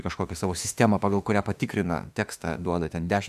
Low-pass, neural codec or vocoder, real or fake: 14.4 kHz; autoencoder, 48 kHz, 32 numbers a frame, DAC-VAE, trained on Japanese speech; fake